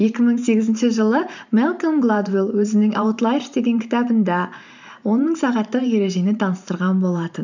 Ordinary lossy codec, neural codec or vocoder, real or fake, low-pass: none; vocoder, 44.1 kHz, 128 mel bands every 512 samples, BigVGAN v2; fake; 7.2 kHz